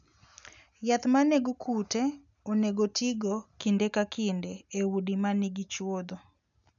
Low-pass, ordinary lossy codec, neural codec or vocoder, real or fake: 7.2 kHz; none; none; real